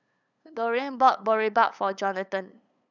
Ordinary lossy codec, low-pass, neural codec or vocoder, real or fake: none; 7.2 kHz; codec, 16 kHz, 8 kbps, FunCodec, trained on LibriTTS, 25 frames a second; fake